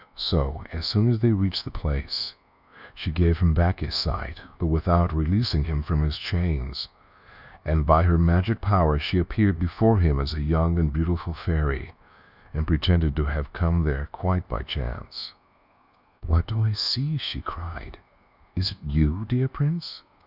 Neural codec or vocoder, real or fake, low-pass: codec, 24 kHz, 1.2 kbps, DualCodec; fake; 5.4 kHz